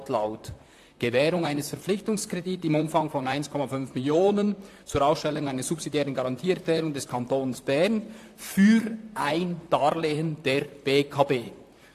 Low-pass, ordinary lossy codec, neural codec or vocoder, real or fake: 14.4 kHz; AAC, 64 kbps; vocoder, 44.1 kHz, 128 mel bands, Pupu-Vocoder; fake